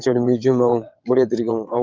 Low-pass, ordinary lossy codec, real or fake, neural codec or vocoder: 7.2 kHz; Opus, 24 kbps; fake; vocoder, 22.05 kHz, 80 mel bands, WaveNeXt